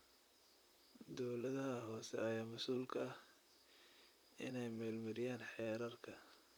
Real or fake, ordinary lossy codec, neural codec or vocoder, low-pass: fake; none; vocoder, 44.1 kHz, 128 mel bands, Pupu-Vocoder; none